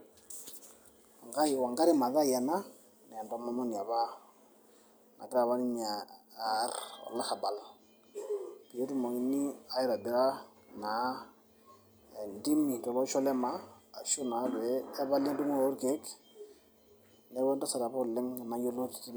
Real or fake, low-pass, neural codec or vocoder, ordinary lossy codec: real; none; none; none